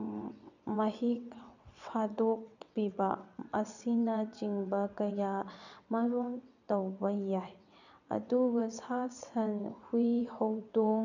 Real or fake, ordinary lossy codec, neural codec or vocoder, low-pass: fake; none; vocoder, 22.05 kHz, 80 mel bands, WaveNeXt; 7.2 kHz